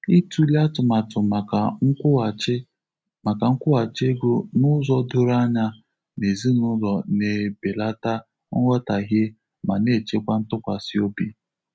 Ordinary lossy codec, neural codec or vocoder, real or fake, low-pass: none; none; real; none